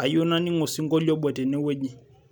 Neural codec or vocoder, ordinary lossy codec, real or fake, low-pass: none; none; real; none